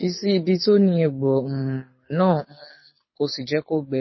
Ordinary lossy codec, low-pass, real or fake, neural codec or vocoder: MP3, 24 kbps; 7.2 kHz; fake; codec, 24 kHz, 6 kbps, HILCodec